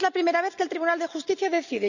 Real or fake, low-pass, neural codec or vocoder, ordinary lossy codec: real; 7.2 kHz; none; none